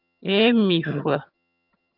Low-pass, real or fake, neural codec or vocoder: 5.4 kHz; fake; vocoder, 22.05 kHz, 80 mel bands, HiFi-GAN